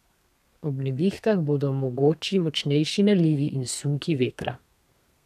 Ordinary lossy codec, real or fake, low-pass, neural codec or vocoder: none; fake; 14.4 kHz; codec, 32 kHz, 1.9 kbps, SNAC